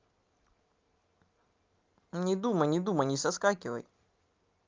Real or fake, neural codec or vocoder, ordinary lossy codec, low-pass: real; none; Opus, 32 kbps; 7.2 kHz